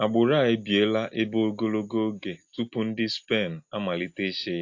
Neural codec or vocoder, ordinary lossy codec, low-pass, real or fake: none; AAC, 48 kbps; 7.2 kHz; real